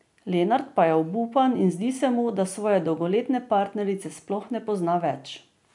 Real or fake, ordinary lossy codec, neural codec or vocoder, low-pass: real; none; none; 10.8 kHz